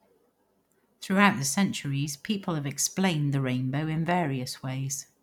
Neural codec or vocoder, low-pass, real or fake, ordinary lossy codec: none; 19.8 kHz; real; none